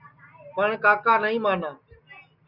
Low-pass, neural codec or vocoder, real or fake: 5.4 kHz; none; real